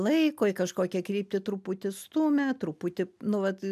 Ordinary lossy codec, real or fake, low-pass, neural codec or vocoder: MP3, 96 kbps; real; 14.4 kHz; none